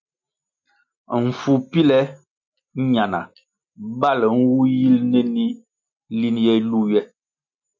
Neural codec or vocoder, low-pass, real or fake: none; 7.2 kHz; real